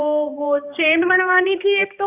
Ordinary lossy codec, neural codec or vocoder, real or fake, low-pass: none; codec, 16 kHz, 2 kbps, X-Codec, HuBERT features, trained on balanced general audio; fake; 3.6 kHz